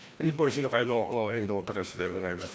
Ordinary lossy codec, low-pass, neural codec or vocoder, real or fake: none; none; codec, 16 kHz, 1 kbps, FreqCodec, larger model; fake